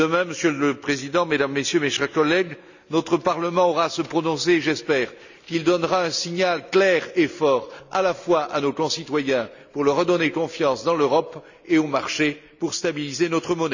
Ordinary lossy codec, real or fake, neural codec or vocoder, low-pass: none; real; none; 7.2 kHz